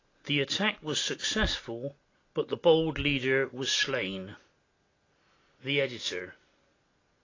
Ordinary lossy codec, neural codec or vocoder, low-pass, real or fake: AAC, 32 kbps; none; 7.2 kHz; real